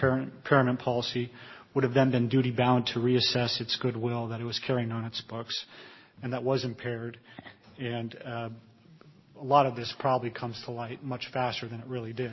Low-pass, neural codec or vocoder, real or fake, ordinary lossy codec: 7.2 kHz; none; real; MP3, 24 kbps